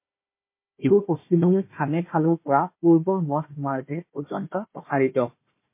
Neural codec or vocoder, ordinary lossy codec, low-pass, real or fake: codec, 16 kHz, 1 kbps, FunCodec, trained on Chinese and English, 50 frames a second; MP3, 24 kbps; 3.6 kHz; fake